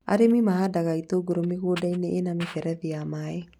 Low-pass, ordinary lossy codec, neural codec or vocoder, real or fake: 19.8 kHz; none; none; real